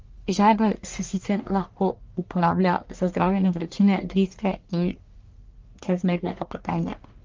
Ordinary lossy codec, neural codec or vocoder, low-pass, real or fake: Opus, 24 kbps; codec, 44.1 kHz, 1.7 kbps, Pupu-Codec; 7.2 kHz; fake